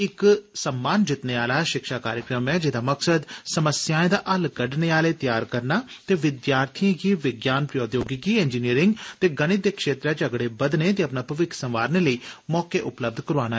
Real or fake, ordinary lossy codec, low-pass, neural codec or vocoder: real; none; none; none